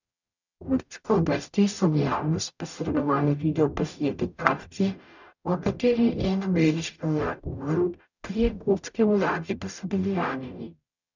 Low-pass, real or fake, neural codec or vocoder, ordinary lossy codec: 7.2 kHz; fake; codec, 44.1 kHz, 0.9 kbps, DAC; none